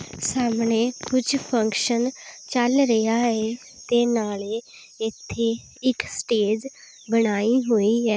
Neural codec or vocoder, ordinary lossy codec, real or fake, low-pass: none; none; real; none